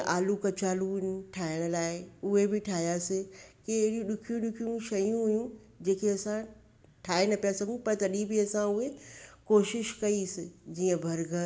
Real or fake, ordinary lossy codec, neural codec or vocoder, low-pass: real; none; none; none